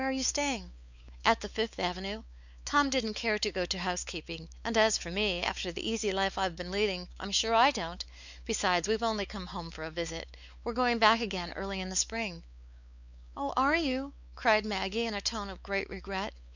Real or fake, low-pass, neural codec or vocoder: fake; 7.2 kHz; codec, 16 kHz, 4 kbps, X-Codec, WavLM features, trained on Multilingual LibriSpeech